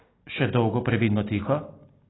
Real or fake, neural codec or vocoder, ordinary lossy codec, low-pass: real; none; AAC, 16 kbps; 7.2 kHz